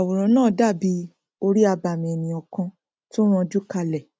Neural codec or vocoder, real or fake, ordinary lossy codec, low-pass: none; real; none; none